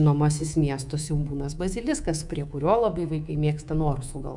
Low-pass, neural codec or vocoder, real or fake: 10.8 kHz; codec, 24 kHz, 3.1 kbps, DualCodec; fake